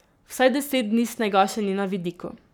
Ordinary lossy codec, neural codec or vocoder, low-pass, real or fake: none; codec, 44.1 kHz, 7.8 kbps, Pupu-Codec; none; fake